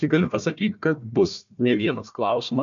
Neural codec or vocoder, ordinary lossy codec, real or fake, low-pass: codec, 16 kHz, 1 kbps, FunCodec, trained on Chinese and English, 50 frames a second; MP3, 64 kbps; fake; 7.2 kHz